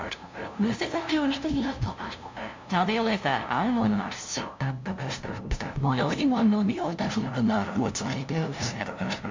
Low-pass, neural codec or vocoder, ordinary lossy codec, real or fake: 7.2 kHz; codec, 16 kHz, 0.5 kbps, FunCodec, trained on LibriTTS, 25 frames a second; MP3, 64 kbps; fake